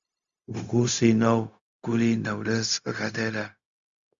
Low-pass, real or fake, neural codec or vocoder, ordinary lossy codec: 7.2 kHz; fake; codec, 16 kHz, 0.4 kbps, LongCat-Audio-Codec; Opus, 64 kbps